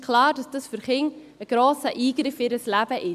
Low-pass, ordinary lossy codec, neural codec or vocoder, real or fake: 14.4 kHz; AAC, 96 kbps; none; real